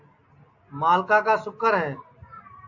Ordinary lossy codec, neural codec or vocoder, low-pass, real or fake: AAC, 48 kbps; none; 7.2 kHz; real